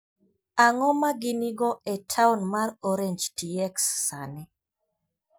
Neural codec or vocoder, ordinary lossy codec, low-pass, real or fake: none; none; none; real